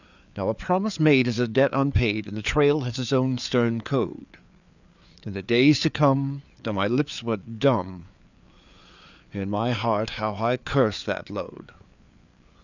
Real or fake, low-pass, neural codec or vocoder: fake; 7.2 kHz; codec, 16 kHz, 4 kbps, FreqCodec, larger model